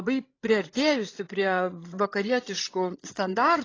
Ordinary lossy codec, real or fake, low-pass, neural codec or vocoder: AAC, 32 kbps; fake; 7.2 kHz; codec, 16 kHz, 16 kbps, FreqCodec, larger model